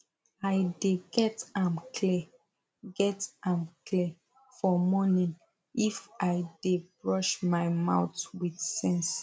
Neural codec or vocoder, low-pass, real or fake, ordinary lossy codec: none; none; real; none